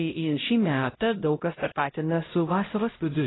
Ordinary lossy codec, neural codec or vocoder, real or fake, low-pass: AAC, 16 kbps; codec, 16 kHz, 0.5 kbps, X-Codec, HuBERT features, trained on LibriSpeech; fake; 7.2 kHz